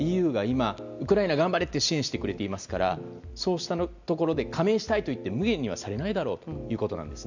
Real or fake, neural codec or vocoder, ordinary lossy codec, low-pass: real; none; none; 7.2 kHz